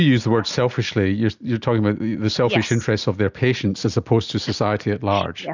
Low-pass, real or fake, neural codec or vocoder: 7.2 kHz; real; none